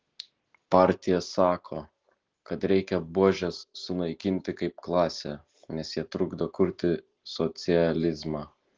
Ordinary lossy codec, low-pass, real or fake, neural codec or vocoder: Opus, 16 kbps; 7.2 kHz; real; none